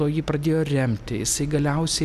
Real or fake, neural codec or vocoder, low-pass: real; none; 14.4 kHz